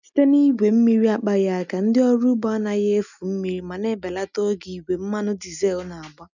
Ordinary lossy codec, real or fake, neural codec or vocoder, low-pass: none; real; none; 7.2 kHz